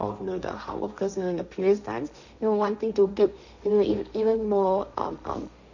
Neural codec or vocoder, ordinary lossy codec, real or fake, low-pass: codec, 16 kHz, 1.1 kbps, Voila-Tokenizer; none; fake; 7.2 kHz